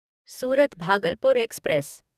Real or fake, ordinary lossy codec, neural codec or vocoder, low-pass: fake; none; codec, 44.1 kHz, 2.6 kbps, DAC; 14.4 kHz